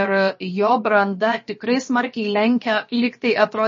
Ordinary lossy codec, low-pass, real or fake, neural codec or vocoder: MP3, 32 kbps; 7.2 kHz; fake; codec, 16 kHz, about 1 kbps, DyCAST, with the encoder's durations